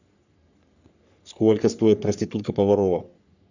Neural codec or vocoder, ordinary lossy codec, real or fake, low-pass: codec, 44.1 kHz, 3.4 kbps, Pupu-Codec; none; fake; 7.2 kHz